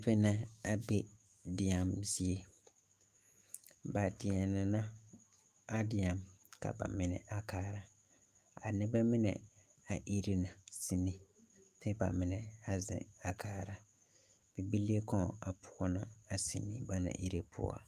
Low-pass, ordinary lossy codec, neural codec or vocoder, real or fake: 14.4 kHz; Opus, 32 kbps; autoencoder, 48 kHz, 128 numbers a frame, DAC-VAE, trained on Japanese speech; fake